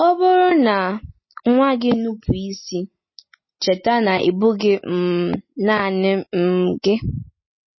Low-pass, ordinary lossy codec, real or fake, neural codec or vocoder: 7.2 kHz; MP3, 24 kbps; real; none